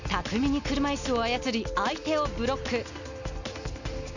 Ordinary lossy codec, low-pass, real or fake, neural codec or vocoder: none; 7.2 kHz; real; none